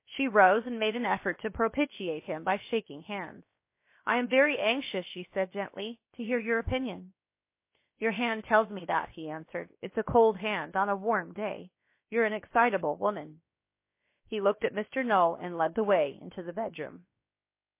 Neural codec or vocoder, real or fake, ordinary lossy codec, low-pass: codec, 16 kHz, about 1 kbps, DyCAST, with the encoder's durations; fake; MP3, 24 kbps; 3.6 kHz